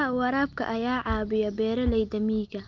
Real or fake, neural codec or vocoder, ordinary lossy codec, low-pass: real; none; Opus, 32 kbps; 7.2 kHz